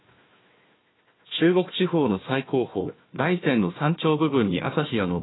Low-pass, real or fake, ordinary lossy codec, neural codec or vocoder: 7.2 kHz; fake; AAC, 16 kbps; codec, 16 kHz, 1 kbps, FunCodec, trained on Chinese and English, 50 frames a second